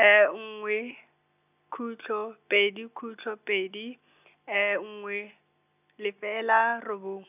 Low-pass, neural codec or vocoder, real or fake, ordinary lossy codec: 3.6 kHz; none; real; none